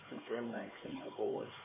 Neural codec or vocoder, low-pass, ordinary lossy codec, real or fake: codec, 16 kHz, 4 kbps, X-Codec, HuBERT features, trained on LibriSpeech; 3.6 kHz; MP3, 16 kbps; fake